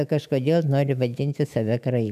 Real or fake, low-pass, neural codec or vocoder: fake; 14.4 kHz; autoencoder, 48 kHz, 128 numbers a frame, DAC-VAE, trained on Japanese speech